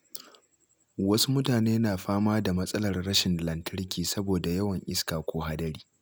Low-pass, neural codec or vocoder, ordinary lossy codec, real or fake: none; none; none; real